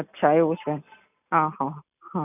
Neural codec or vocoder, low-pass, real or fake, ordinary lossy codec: none; 3.6 kHz; real; none